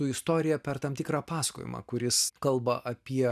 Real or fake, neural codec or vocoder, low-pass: real; none; 14.4 kHz